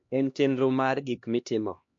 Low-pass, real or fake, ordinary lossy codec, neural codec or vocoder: 7.2 kHz; fake; MP3, 48 kbps; codec, 16 kHz, 1 kbps, X-Codec, HuBERT features, trained on LibriSpeech